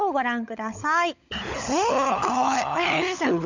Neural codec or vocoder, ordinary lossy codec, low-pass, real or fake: codec, 16 kHz, 16 kbps, FunCodec, trained on LibriTTS, 50 frames a second; none; 7.2 kHz; fake